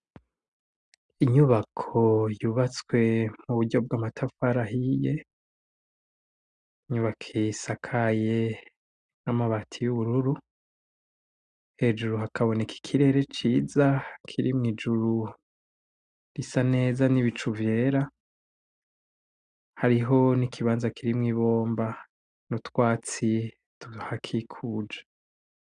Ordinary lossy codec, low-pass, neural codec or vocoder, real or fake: Opus, 64 kbps; 9.9 kHz; none; real